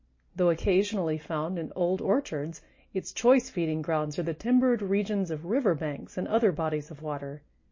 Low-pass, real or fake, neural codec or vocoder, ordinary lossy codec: 7.2 kHz; real; none; MP3, 32 kbps